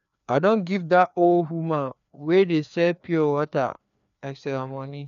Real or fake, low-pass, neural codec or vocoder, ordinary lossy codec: fake; 7.2 kHz; codec, 16 kHz, 2 kbps, FreqCodec, larger model; none